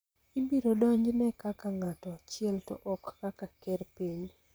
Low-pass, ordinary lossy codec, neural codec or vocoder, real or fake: none; none; vocoder, 44.1 kHz, 128 mel bands, Pupu-Vocoder; fake